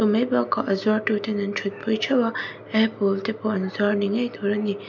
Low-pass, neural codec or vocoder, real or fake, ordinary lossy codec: 7.2 kHz; none; real; none